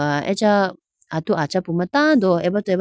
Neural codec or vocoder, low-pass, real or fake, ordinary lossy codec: none; none; real; none